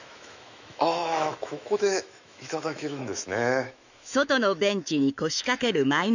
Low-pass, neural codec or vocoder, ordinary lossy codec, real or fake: 7.2 kHz; autoencoder, 48 kHz, 128 numbers a frame, DAC-VAE, trained on Japanese speech; none; fake